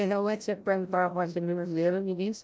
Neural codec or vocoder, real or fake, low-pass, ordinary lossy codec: codec, 16 kHz, 0.5 kbps, FreqCodec, larger model; fake; none; none